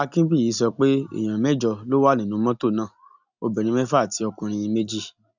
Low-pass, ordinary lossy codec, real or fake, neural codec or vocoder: 7.2 kHz; none; real; none